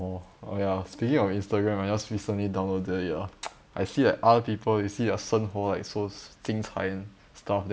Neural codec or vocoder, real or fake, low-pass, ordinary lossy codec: none; real; none; none